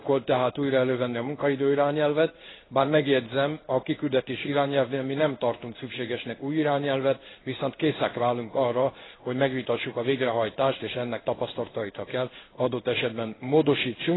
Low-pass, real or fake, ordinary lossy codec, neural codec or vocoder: 7.2 kHz; fake; AAC, 16 kbps; codec, 16 kHz in and 24 kHz out, 1 kbps, XY-Tokenizer